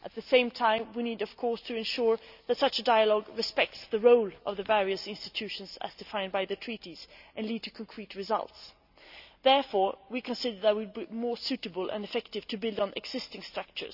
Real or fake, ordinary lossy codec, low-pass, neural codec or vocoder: real; none; 5.4 kHz; none